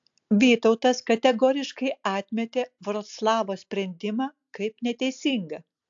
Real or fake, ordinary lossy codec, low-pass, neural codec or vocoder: real; AAC, 64 kbps; 7.2 kHz; none